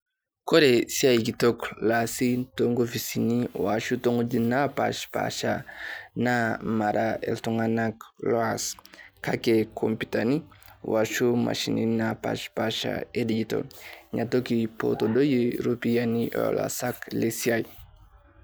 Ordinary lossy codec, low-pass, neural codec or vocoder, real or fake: none; none; none; real